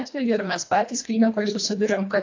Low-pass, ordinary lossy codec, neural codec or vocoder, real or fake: 7.2 kHz; AAC, 48 kbps; codec, 24 kHz, 1.5 kbps, HILCodec; fake